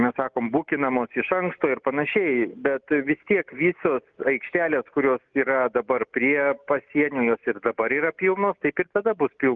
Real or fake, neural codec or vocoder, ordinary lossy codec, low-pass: real; none; Opus, 32 kbps; 7.2 kHz